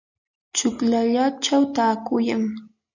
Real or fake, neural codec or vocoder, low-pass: real; none; 7.2 kHz